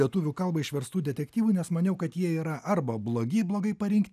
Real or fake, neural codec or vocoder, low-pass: real; none; 14.4 kHz